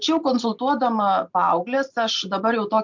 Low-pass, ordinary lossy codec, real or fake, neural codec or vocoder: 7.2 kHz; MP3, 64 kbps; real; none